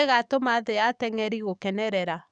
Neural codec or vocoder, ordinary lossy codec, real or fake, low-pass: codec, 44.1 kHz, 7.8 kbps, DAC; none; fake; 9.9 kHz